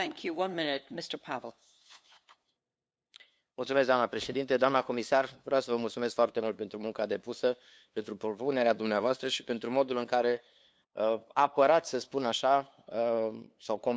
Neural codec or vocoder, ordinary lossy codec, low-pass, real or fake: codec, 16 kHz, 2 kbps, FunCodec, trained on LibriTTS, 25 frames a second; none; none; fake